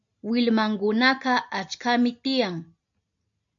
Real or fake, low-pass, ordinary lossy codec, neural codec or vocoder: real; 7.2 kHz; AAC, 64 kbps; none